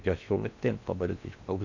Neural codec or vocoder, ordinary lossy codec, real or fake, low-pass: codec, 16 kHz in and 24 kHz out, 0.8 kbps, FocalCodec, streaming, 65536 codes; none; fake; 7.2 kHz